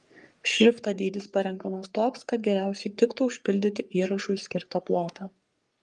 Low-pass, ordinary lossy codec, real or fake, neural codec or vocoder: 10.8 kHz; Opus, 32 kbps; fake; codec, 44.1 kHz, 3.4 kbps, Pupu-Codec